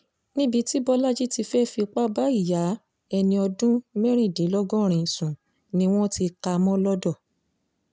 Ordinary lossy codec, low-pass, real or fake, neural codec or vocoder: none; none; real; none